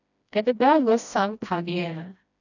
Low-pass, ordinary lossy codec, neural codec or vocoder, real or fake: 7.2 kHz; none; codec, 16 kHz, 1 kbps, FreqCodec, smaller model; fake